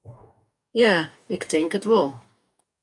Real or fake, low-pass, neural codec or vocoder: fake; 10.8 kHz; codec, 44.1 kHz, 2.6 kbps, DAC